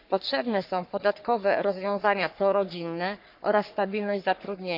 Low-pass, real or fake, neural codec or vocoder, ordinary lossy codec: 5.4 kHz; fake; codec, 44.1 kHz, 3.4 kbps, Pupu-Codec; none